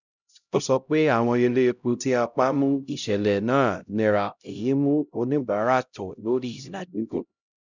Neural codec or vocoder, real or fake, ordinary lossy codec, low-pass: codec, 16 kHz, 0.5 kbps, X-Codec, HuBERT features, trained on LibriSpeech; fake; none; 7.2 kHz